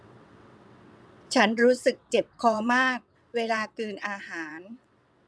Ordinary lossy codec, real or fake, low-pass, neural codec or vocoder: none; fake; none; vocoder, 22.05 kHz, 80 mel bands, WaveNeXt